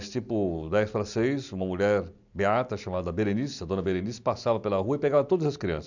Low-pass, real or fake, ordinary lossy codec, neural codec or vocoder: 7.2 kHz; real; none; none